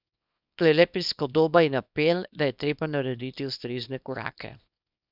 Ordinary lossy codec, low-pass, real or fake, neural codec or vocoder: none; 5.4 kHz; fake; codec, 24 kHz, 0.9 kbps, WavTokenizer, small release